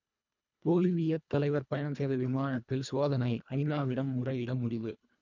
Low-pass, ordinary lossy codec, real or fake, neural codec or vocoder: 7.2 kHz; none; fake; codec, 24 kHz, 1.5 kbps, HILCodec